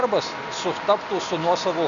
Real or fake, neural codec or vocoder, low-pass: real; none; 7.2 kHz